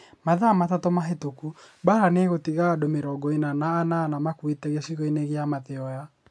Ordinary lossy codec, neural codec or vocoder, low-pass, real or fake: none; none; none; real